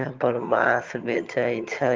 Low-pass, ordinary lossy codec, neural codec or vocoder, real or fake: 7.2 kHz; Opus, 24 kbps; vocoder, 22.05 kHz, 80 mel bands, HiFi-GAN; fake